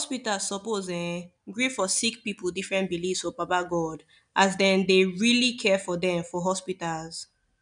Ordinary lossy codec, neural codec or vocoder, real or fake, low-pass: none; none; real; 9.9 kHz